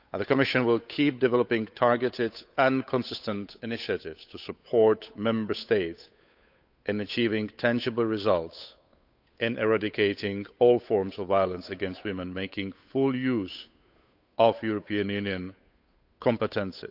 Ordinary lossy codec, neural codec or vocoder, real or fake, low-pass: none; codec, 16 kHz, 8 kbps, FunCodec, trained on Chinese and English, 25 frames a second; fake; 5.4 kHz